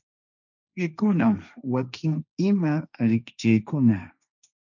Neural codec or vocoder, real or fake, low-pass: codec, 16 kHz, 1.1 kbps, Voila-Tokenizer; fake; 7.2 kHz